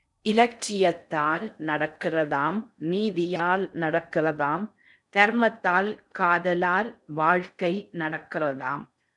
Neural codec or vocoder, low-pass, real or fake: codec, 16 kHz in and 24 kHz out, 0.6 kbps, FocalCodec, streaming, 4096 codes; 10.8 kHz; fake